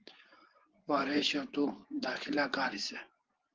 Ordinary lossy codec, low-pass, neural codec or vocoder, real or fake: Opus, 16 kbps; 7.2 kHz; vocoder, 24 kHz, 100 mel bands, Vocos; fake